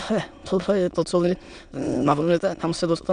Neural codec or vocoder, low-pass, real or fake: autoencoder, 22.05 kHz, a latent of 192 numbers a frame, VITS, trained on many speakers; 9.9 kHz; fake